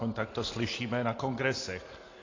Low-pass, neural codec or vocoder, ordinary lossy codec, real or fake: 7.2 kHz; none; AAC, 32 kbps; real